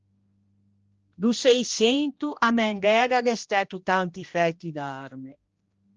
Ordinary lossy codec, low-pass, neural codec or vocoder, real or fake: Opus, 24 kbps; 7.2 kHz; codec, 16 kHz, 1 kbps, X-Codec, HuBERT features, trained on general audio; fake